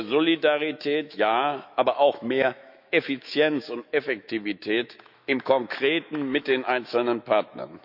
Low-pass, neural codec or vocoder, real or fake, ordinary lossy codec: 5.4 kHz; autoencoder, 48 kHz, 128 numbers a frame, DAC-VAE, trained on Japanese speech; fake; none